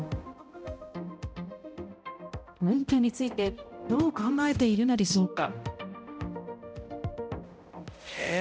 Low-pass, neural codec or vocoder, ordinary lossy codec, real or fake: none; codec, 16 kHz, 0.5 kbps, X-Codec, HuBERT features, trained on balanced general audio; none; fake